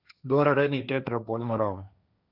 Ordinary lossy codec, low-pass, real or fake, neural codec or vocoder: none; 5.4 kHz; fake; codec, 16 kHz, 1.1 kbps, Voila-Tokenizer